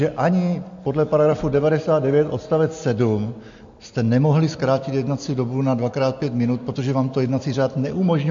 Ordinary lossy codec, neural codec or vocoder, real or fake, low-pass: MP3, 48 kbps; none; real; 7.2 kHz